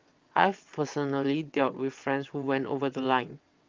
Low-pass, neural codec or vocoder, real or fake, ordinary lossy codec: 7.2 kHz; vocoder, 22.05 kHz, 80 mel bands, WaveNeXt; fake; Opus, 24 kbps